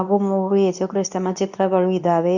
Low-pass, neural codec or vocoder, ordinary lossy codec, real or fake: 7.2 kHz; codec, 24 kHz, 0.9 kbps, WavTokenizer, medium speech release version 2; none; fake